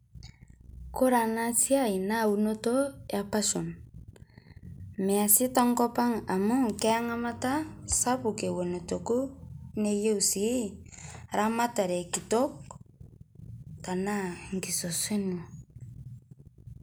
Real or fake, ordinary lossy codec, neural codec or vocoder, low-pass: real; none; none; none